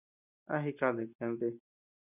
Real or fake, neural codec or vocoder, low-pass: real; none; 3.6 kHz